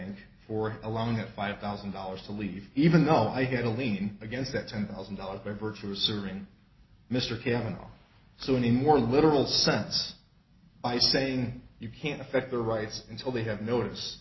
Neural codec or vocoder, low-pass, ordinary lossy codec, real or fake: none; 7.2 kHz; MP3, 24 kbps; real